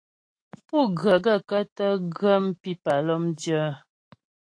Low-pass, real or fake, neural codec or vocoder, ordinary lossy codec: 9.9 kHz; fake; autoencoder, 48 kHz, 128 numbers a frame, DAC-VAE, trained on Japanese speech; AAC, 48 kbps